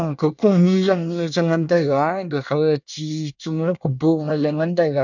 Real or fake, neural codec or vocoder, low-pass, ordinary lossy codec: fake; codec, 24 kHz, 1 kbps, SNAC; 7.2 kHz; none